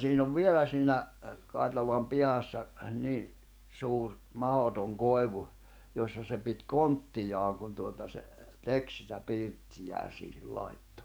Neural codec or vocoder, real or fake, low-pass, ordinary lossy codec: codec, 44.1 kHz, 7.8 kbps, DAC; fake; none; none